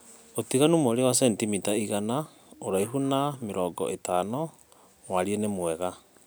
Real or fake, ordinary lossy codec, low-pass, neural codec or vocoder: real; none; none; none